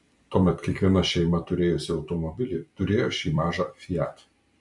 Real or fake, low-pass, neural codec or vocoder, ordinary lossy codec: fake; 10.8 kHz; vocoder, 44.1 kHz, 128 mel bands every 256 samples, BigVGAN v2; MP3, 64 kbps